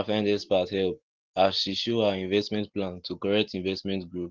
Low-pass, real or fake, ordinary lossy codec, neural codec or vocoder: 7.2 kHz; real; Opus, 16 kbps; none